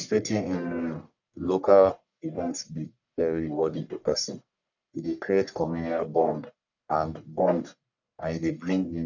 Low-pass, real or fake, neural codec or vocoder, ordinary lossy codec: 7.2 kHz; fake; codec, 44.1 kHz, 1.7 kbps, Pupu-Codec; none